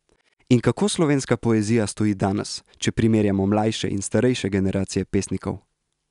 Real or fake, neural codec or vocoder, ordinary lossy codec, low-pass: real; none; none; 10.8 kHz